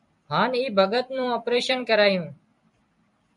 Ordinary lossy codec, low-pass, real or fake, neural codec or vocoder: MP3, 96 kbps; 10.8 kHz; fake; vocoder, 44.1 kHz, 128 mel bands every 256 samples, BigVGAN v2